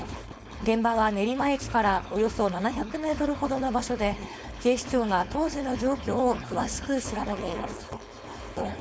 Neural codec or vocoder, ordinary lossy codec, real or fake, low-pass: codec, 16 kHz, 4.8 kbps, FACodec; none; fake; none